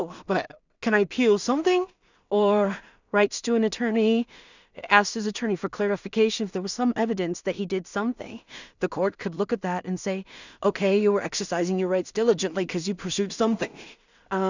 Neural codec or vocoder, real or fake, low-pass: codec, 16 kHz in and 24 kHz out, 0.4 kbps, LongCat-Audio-Codec, two codebook decoder; fake; 7.2 kHz